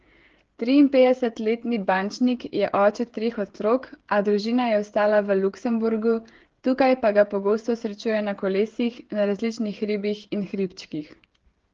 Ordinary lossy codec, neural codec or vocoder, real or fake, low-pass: Opus, 16 kbps; codec, 16 kHz, 16 kbps, FreqCodec, smaller model; fake; 7.2 kHz